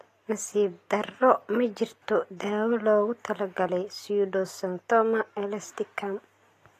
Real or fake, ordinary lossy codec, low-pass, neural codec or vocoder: real; AAC, 48 kbps; 14.4 kHz; none